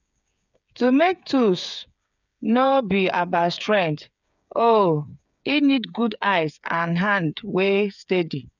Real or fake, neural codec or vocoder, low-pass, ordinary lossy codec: fake; codec, 16 kHz, 8 kbps, FreqCodec, smaller model; 7.2 kHz; none